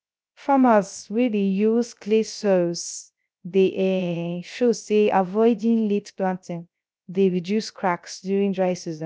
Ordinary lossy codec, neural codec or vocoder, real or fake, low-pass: none; codec, 16 kHz, 0.3 kbps, FocalCodec; fake; none